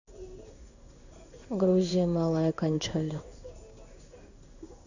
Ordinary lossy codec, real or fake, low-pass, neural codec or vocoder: none; fake; 7.2 kHz; codec, 16 kHz in and 24 kHz out, 1 kbps, XY-Tokenizer